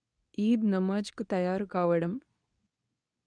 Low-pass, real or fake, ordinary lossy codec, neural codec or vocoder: 9.9 kHz; fake; none; codec, 24 kHz, 0.9 kbps, WavTokenizer, medium speech release version 2